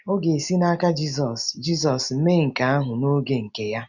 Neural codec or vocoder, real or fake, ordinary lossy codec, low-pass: none; real; none; 7.2 kHz